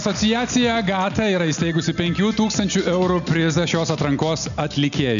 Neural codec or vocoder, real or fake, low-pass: none; real; 7.2 kHz